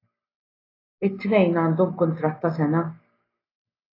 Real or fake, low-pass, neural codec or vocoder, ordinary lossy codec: real; 5.4 kHz; none; AAC, 24 kbps